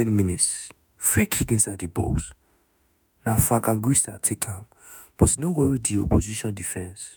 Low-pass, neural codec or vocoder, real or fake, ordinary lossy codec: none; autoencoder, 48 kHz, 32 numbers a frame, DAC-VAE, trained on Japanese speech; fake; none